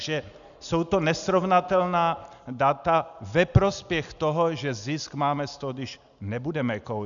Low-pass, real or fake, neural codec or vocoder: 7.2 kHz; real; none